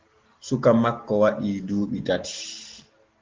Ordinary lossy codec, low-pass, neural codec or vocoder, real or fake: Opus, 16 kbps; 7.2 kHz; none; real